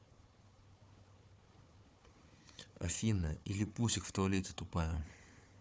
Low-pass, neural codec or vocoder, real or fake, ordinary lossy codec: none; codec, 16 kHz, 4 kbps, FunCodec, trained on Chinese and English, 50 frames a second; fake; none